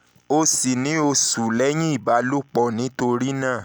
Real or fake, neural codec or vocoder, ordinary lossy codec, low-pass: real; none; none; none